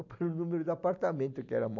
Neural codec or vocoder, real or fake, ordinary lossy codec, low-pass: none; real; none; 7.2 kHz